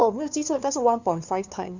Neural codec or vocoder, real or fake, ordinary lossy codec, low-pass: codec, 16 kHz, 2 kbps, FunCodec, trained on LibriTTS, 25 frames a second; fake; none; 7.2 kHz